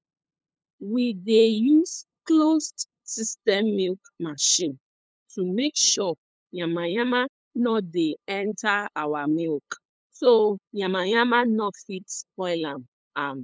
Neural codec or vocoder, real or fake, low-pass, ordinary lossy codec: codec, 16 kHz, 8 kbps, FunCodec, trained on LibriTTS, 25 frames a second; fake; none; none